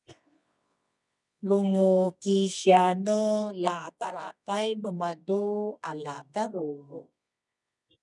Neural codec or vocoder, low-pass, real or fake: codec, 24 kHz, 0.9 kbps, WavTokenizer, medium music audio release; 10.8 kHz; fake